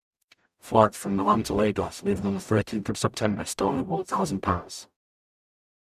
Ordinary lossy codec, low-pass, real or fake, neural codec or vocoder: none; 14.4 kHz; fake; codec, 44.1 kHz, 0.9 kbps, DAC